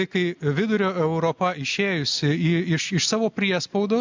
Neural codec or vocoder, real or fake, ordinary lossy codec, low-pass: none; real; MP3, 64 kbps; 7.2 kHz